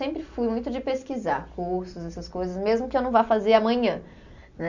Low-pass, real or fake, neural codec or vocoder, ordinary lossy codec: 7.2 kHz; real; none; none